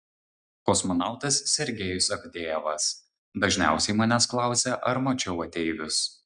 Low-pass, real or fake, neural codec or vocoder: 9.9 kHz; real; none